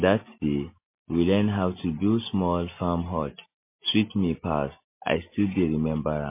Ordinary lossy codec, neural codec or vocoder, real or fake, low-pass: MP3, 24 kbps; none; real; 3.6 kHz